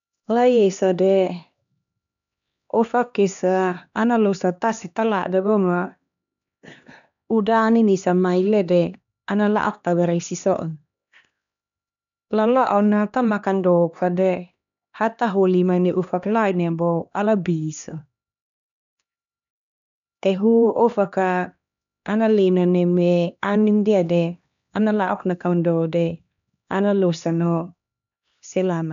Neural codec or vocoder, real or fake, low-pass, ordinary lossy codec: codec, 16 kHz, 2 kbps, X-Codec, HuBERT features, trained on LibriSpeech; fake; 7.2 kHz; none